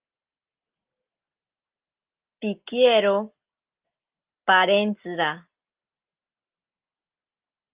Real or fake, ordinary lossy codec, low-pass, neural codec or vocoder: real; Opus, 32 kbps; 3.6 kHz; none